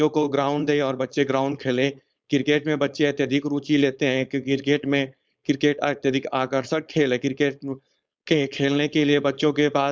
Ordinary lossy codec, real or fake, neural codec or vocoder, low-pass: none; fake; codec, 16 kHz, 4.8 kbps, FACodec; none